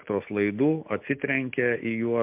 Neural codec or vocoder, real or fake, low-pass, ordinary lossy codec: none; real; 3.6 kHz; MP3, 32 kbps